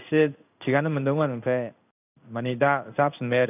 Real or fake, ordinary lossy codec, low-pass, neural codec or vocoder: fake; none; 3.6 kHz; codec, 16 kHz in and 24 kHz out, 1 kbps, XY-Tokenizer